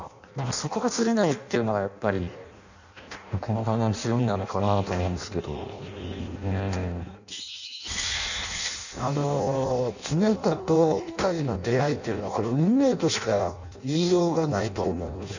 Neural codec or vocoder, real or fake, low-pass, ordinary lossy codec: codec, 16 kHz in and 24 kHz out, 0.6 kbps, FireRedTTS-2 codec; fake; 7.2 kHz; none